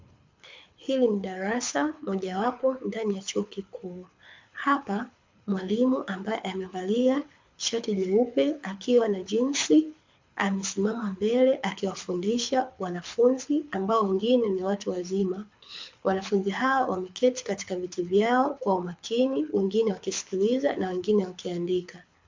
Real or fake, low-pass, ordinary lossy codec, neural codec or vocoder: fake; 7.2 kHz; MP3, 64 kbps; codec, 24 kHz, 6 kbps, HILCodec